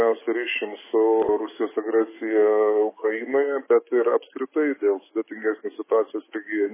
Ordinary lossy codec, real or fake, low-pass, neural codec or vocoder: MP3, 16 kbps; fake; 3.6 kHz; autoencoder, 48 kHz, 128 numbers a frame, DAC-VAE, trained on Japanese speech